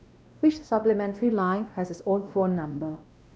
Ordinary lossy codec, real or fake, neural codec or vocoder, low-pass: none; fake; codec, 16 kHz, 1 kbps, X-Codec, WavLM features, trained on Multilingual LibriSpeech; none